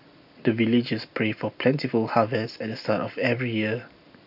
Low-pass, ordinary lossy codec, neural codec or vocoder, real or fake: 5.4 kHz; none; none; real